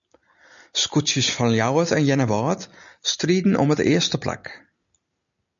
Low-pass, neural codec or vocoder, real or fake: 7.2 kHz; none; real